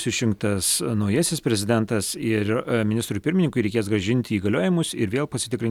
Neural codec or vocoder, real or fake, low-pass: none; real; 19.8 kHz